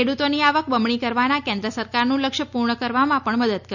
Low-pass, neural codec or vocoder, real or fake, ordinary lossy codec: 7.2 kHz; none; real; none